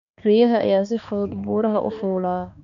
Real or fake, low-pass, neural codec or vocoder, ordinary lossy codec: fake; 7.2 kHz; codec, 16 kHz, 2 kbps, X-Codec, HuBERT features, trained on balanced general audio; none